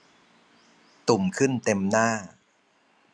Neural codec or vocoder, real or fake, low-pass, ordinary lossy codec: none; real; none; none